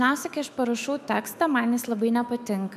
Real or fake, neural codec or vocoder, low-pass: fake; autoencoder, 48 kHz, 128 numbers a frame, DAC-VAE, trained on Japanese speech; 14.4 kHz